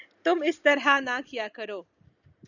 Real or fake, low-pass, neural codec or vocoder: real; 7.2 kHz; none